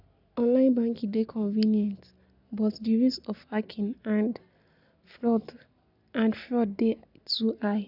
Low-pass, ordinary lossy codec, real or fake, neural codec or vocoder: 5.4 kHz; none; real; none